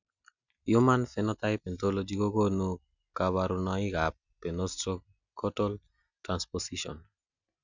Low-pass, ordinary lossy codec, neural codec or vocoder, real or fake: 7.2 kHz; none; none; real